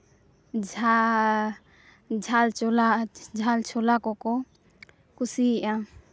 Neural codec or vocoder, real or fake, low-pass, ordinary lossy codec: none; real; none; none